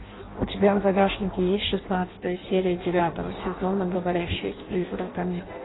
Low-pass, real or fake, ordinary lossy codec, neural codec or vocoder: 7.2 kHz; fake; AAC, 16 kbps; codec, 16 kHz in and 24 kHz out, 0.6 kbps, FireRedTTS-2 codec